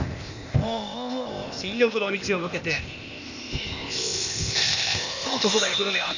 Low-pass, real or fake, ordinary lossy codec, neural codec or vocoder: 7.2 kHz; fake; none; codec, 16 kHz, 0.8 kbps, ZipCodec